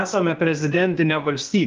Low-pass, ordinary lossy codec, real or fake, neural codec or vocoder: 7.2 kHz; Opus, 32 kbps; fake; codec, 16 kHz, 0.8 kbps, ZipCodec